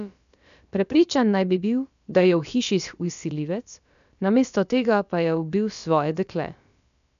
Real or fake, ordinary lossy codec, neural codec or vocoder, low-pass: fake; none; codec, 16 kHz, about 1 kbps, DyCAST, with the encoder's durations; 7.2 kHz